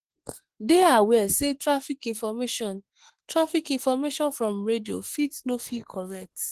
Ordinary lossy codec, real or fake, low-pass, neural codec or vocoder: Opus, 16 kbps; fake; 14.4 kHz; autoencoder, 48 kHz, 32 numbers a frame, DAC-VAE, trained on Japanese speech